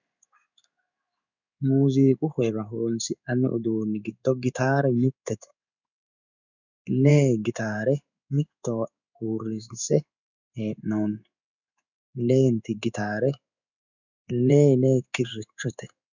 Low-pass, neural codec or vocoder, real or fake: 7.2 kHz; codec, 16 kHz in and 24 kHz out, 1 kbps, XY-Tokenizer; fake